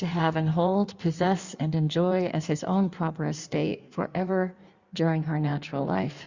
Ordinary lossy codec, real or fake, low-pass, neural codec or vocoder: Opus, 64 kbps; fake; 7.2 kHz; codec, 16 kHz in and 24 kHz out, 1.1 kbps, FireRedTTS-2 codec